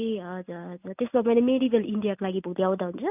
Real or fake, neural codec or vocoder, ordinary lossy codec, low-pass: real; none; none; 3.6 kHz